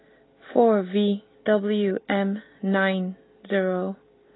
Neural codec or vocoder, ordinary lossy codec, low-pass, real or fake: none; AAC, 16 kbps; 7.2 kHz; real